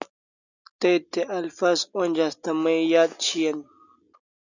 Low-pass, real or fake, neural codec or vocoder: 7.2 kHz; real; none